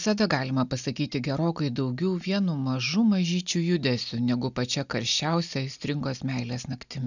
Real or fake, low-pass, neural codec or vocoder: real; 7.2 kHz; none